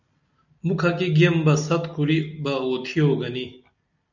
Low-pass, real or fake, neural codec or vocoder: 7.2 kHz; real; none